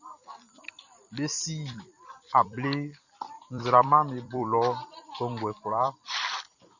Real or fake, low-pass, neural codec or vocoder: fake; 7.2 kHz; vocoder, 44.1 kHz, 128 mel bands every 256 samples, BigVGAN v2